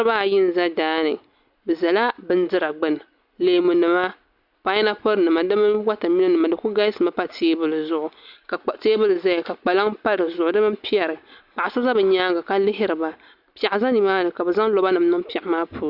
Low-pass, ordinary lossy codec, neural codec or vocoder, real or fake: 5.4 kHz; Opus, 64 kbps; none; real